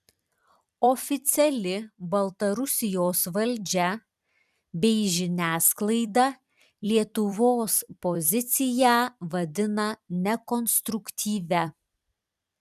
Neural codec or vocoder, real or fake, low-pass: vocoder, 44.1 kHz, 128 mel bands every 256 samples, BigVGAN v2; fake; 14.4 kHz